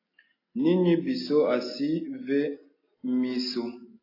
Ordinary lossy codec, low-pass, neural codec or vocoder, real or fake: AAC, 24 kbps; 5.4 kHz; none; real